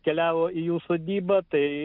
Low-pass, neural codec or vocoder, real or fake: 5.4 kHz; none; real